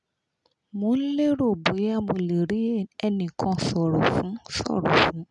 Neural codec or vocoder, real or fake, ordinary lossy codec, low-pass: none; real; none; 10.8 kHz